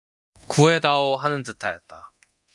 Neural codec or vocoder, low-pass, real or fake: codec, 24 kHz, 0.9 kbps, DualCodec; 10.8 kHz; fake